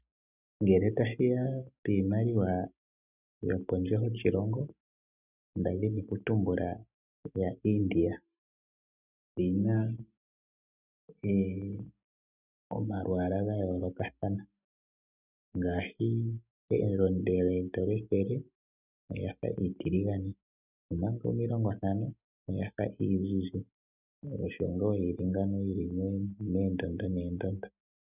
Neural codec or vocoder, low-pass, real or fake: none; 3.6 kHz; real